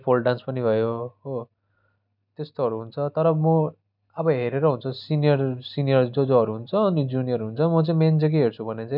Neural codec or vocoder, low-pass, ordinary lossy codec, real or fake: none; 5.4 kHz; none; real